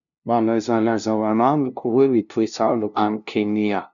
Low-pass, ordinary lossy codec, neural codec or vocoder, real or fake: 7.2 kHz; none; codec, 16 kHz, 0.5 kbps, FunCodec, trained on LibriTTS, 25 frames a second; fake